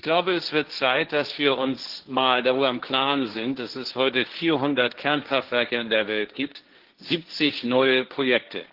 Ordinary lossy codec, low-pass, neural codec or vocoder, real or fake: Opus, 16 kbps; 5.4 kHz; codec, 16 kHz, 1.1 kbps, Voila-Tokenizer; fake